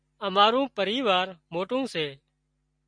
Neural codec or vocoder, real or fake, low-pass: none; real; 9.9 kHz